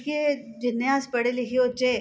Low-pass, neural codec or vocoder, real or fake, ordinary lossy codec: none; none; real; none